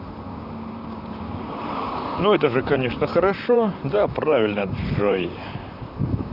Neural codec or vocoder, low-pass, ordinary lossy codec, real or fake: vocoder, 44.1 kHz, 128 mel bands, Pupu-Vocoder; 5.4 kHz; none; fake